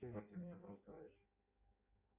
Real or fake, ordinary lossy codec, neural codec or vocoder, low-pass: fake; AAC, 32 kbps; codec, 16 kHz in and 24 kHz out, 0.6 kbps, FireRedTTS-2 codec; 3.6 kHz